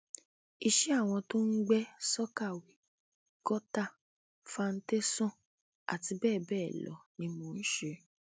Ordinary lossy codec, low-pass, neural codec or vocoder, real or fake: none; none; none; real